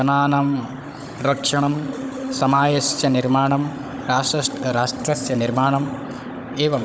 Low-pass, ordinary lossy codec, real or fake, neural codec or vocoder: none; none; fake; codec, 16 kHz, 16 kbps, FunCodec, trained on Chinese and English, 50 frames a second